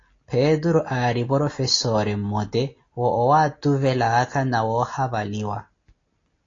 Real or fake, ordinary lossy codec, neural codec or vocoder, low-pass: real; AAC, 32 kbps; none; 7.2 kHz